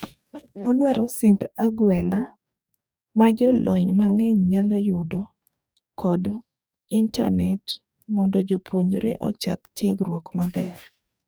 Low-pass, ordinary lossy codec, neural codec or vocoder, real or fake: none; none; codec, 44.1 kHz, 2.6 kbps, DAC; fake